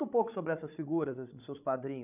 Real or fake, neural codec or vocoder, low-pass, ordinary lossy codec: fake; codec, 16 kHz, 16 kbps, FreqCodec, larger model; 3.6 kHz; none